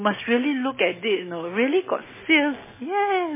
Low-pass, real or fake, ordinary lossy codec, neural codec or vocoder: 3.6 kHz; real; MP3, 16 kbps; none